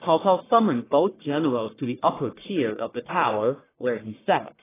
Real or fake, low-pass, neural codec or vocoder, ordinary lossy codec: fake; 3.6 kHz; codec, 44.1 kHz, 1.7 kbps, Pupu-Codec; AAC, 16 kbps